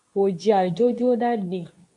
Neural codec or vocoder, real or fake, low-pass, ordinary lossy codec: codec, 24 kHz, 0.9 kbps, WavTokenizer, medium speech release version 2; fake; 10.8 kHz; MP3, 96 kbps